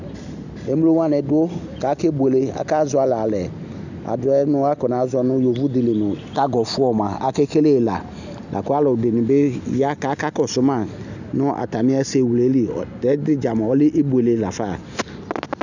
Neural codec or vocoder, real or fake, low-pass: none; real; 7.2 kHz